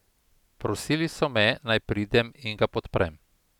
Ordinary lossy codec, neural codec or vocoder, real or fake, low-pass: none; none; real; 19.8 kHz